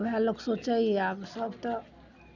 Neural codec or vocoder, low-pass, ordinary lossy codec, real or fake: none; 7.2 kHz; none; real